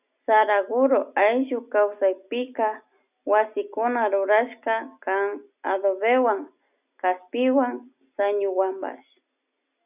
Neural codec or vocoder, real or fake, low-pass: none; real; 3.6 kHz